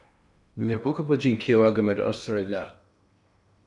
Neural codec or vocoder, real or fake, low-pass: codec, 16 kHz in and 24 kHz out, 0.8 kbps, FocalCodec, streaming, 65536 codes; fake; 10.8 kHz